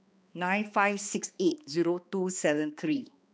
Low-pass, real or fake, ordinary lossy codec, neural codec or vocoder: none; fake; none; codec, 16 kHz, 2 kbps, X-Codec, HuBERT features, trained on balanced general audio